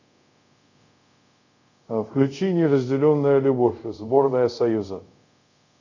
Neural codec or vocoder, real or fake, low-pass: codec, 24 kHz, 0.5 kbps, DualCodec; fake; 7.2 kHz